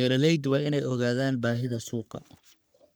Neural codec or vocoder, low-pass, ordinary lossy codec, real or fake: codec, 44.1 kHz, 3.4 kbps, Pupu-Codec; none; none; fake